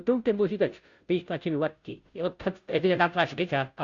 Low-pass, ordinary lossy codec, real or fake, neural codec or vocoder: 7.2 kHz; Opus, 64 kbps; fake; codec, 16 kHz, 0.5 kbps, FunCodec, trained on Chinese and English, 25 frames a second